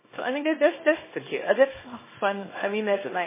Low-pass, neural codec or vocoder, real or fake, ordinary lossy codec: 3.6 kHz; codec, 24 kHz, 0.9 kbps, WavTokenizer, small release; fake; MP3, 16 kbps